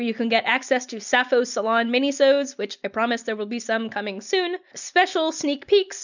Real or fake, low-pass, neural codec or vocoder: real; 7.2 kHz; none